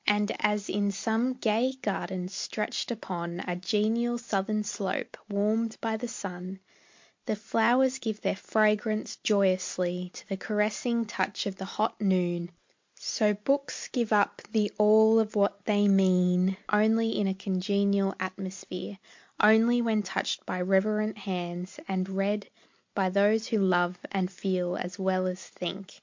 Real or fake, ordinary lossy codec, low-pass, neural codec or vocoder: real; MP3, 48 kbps; 7.2 kHz; none